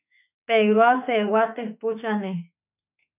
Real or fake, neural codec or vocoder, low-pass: fake; autoencoder, 48 kHz, 32 numbers a frame, DAC-VAE, trained on Japanese speech; 3.6 kHz